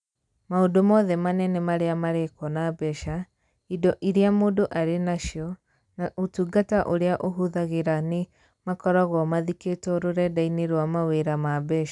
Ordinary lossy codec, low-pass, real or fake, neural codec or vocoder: none; 10.8 kHz; real; none